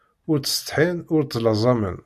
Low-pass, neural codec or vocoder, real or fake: 14.4 kHz; none; real